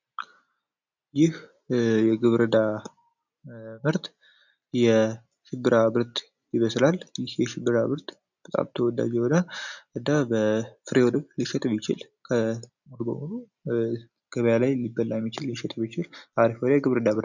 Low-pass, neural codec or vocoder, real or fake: 7.2 kHz; none; real